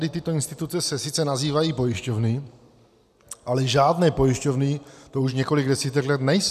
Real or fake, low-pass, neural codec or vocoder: real; 14.4 kHz; none